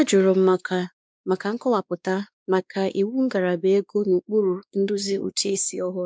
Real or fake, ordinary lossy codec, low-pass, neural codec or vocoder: fake; none; none; codec, 16 kHz, 2 kbps, X-Codec, WavLM features, trained on Multilingual LibriSpeech